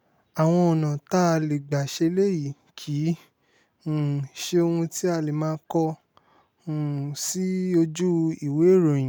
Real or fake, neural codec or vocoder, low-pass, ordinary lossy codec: real; none; none; none